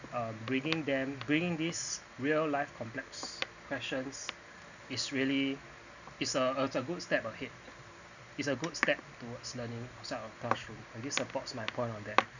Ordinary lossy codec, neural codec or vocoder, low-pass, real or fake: none; none; 7.2 kHz; real